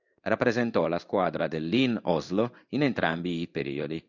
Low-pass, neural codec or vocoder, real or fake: 7.2 kHz; codec, 16 kHz in and 24 kHz out, 1 kbps, XY-Tokenizer; fake